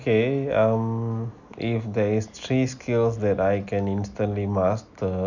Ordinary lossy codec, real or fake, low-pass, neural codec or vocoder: none; real; 7.2 kHz; none